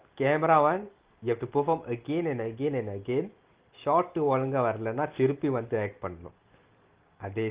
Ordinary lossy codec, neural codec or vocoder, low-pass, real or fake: Opus, 16 kbps; none; 3.6 kHz; real